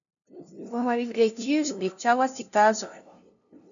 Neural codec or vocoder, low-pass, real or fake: codec, 16 kHz, 0.5 kbps, FunCodec, trained on LibriTTS, 25 frames a second; 7.2 kHz; fake